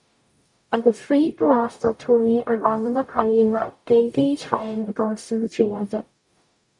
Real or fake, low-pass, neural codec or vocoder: fake; 10.8 kHz; codec, 44.1 kHz, 0.9 kbps, DAC